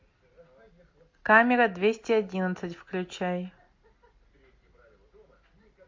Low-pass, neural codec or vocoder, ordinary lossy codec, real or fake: 7.2 kHz; none; MP3, 48 kbps; real